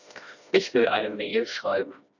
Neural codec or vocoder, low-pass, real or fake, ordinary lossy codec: codec, 16 kHz, 1 kbps, FreqCodec, smaller model; 7.2 kHz; fake; none